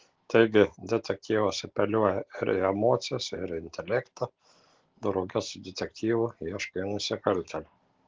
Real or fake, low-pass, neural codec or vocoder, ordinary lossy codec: real; 7.2 kHz; none; Opus, 16 kbps